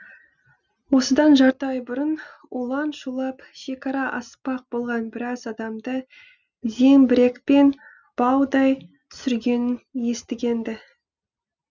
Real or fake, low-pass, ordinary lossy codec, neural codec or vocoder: real; 7.2 kHz; none; none